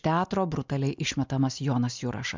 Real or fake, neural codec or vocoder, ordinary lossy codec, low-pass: real; none; MP3, 64 kbps; 7.2 kHz